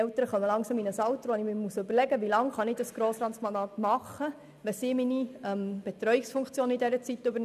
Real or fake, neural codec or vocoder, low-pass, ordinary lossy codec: real; none; 14.4 kHz; none